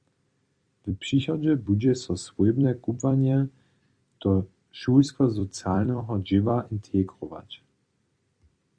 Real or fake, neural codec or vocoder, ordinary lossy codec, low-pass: fake; vocoder, 44.1 kHz, 128 mel bands every 256 samples, BigVGAN v2; Opus, 64 kbps; 9.9 kHz